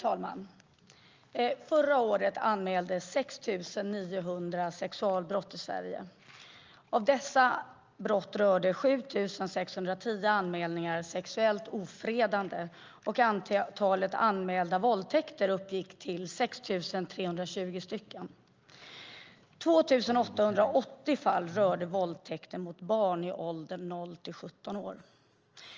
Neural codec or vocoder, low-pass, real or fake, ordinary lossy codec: none; 7.2 kHz; real; Opus, 24 kbps